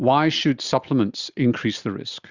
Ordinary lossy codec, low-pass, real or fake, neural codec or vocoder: Opus, 64 kbps; 7.2 kHz; real; none